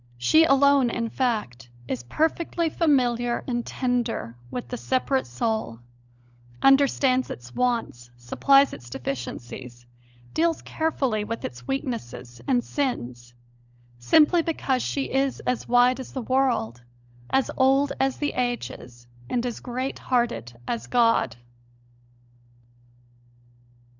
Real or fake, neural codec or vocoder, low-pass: fake; codec, 16 kHz, 16 kbps, FunCodec, trained on LibriTTS, 50 frames a second; 7.2 kHz